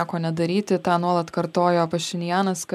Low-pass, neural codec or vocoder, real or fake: 14.4 kHz; none; real